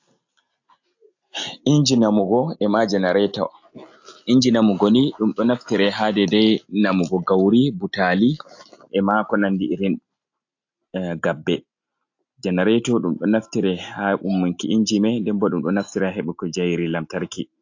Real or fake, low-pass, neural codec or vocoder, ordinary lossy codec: real; 7.2 kHz; none; AAC, 48 kbps